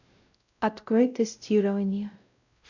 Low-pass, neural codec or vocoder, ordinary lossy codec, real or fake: 7.2 kHz; codec, 16 kHz, 0.5 kbps, X-Codec, WavLM features, trained on Multilingual LibriSpeech; none; fake